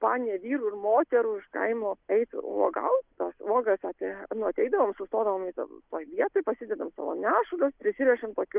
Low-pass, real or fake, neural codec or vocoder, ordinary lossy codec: 3.6 kHz; real; none; Opus, 24 kbps